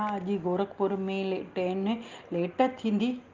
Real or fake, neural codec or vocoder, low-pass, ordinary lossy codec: real; none; 7.2 kHz; Opus, 32 kbps